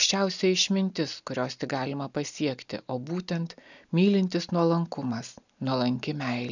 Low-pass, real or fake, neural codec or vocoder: 7.2 kHz; real; none